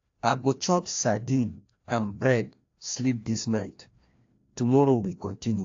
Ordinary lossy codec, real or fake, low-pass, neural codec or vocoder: AAC, 48 kbps; fake; 7.2 kHz; codec, 16 kHz, 1 kbps, FreqCodec, larger model